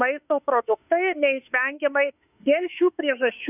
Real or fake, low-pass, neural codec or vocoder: fake; 3.6 kHz; autoencoder, 48 kHz, 32 numbers a frame, DAC-VAE, trained on Japanese speech